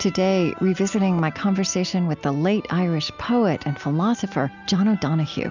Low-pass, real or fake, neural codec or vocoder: 7.2 kHz; real; none